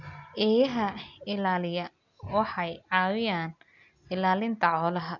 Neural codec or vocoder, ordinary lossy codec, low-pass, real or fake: none; none; 7.2 kHz; real